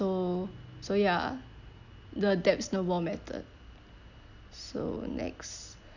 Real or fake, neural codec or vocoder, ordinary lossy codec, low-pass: real; none; none; 7.2 kHz